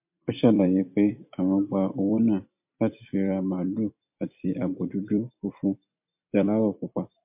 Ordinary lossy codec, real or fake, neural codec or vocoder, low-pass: MP3, 32 kbps; fake; vocoder, 44.1 kHz, 128 mel bands every 256 samples, BigVGAN v2; 3.6 kHz